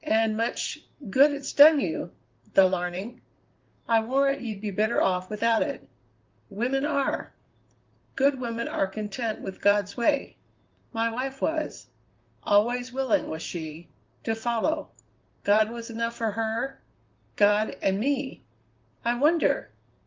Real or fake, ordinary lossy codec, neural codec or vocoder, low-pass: fake; Opus, 32 kbps; vocoder, 44.1 kHz, 128 mel bands, Pupu-Vocoder; 7.2 kHz